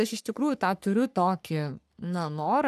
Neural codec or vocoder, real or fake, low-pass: codec, 44.1 kHz, 3.4 kbps, Pupu-Codec; fake; 14.4 kHz